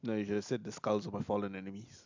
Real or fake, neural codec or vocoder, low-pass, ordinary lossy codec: real; none; 7.2 kHz; MP3, 64 kbps